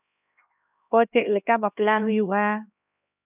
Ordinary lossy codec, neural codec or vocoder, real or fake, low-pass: none; codec, 16 kHz, 1 kbps, X-Codec, HuBERT features, trained on LibriSpeech; fake; 3.6 kHz